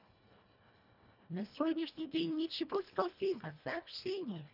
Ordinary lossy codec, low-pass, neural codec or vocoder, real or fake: none; 5.4 kHz; codec, 24 kHz, 1.5 kbps, HILCodec; fake